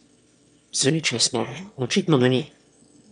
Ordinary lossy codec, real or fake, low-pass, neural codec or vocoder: none; fake; 9.9 kHz; autoencoder, 22.05 kHz, a latent of 192 numbers a frame, VITS, trained on one speaker